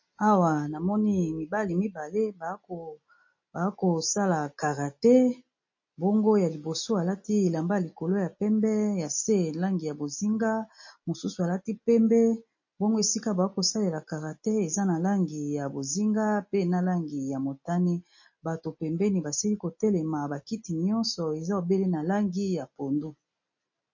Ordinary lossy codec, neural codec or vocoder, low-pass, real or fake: MP3, 32 kbps; none; 7.2 kHz; real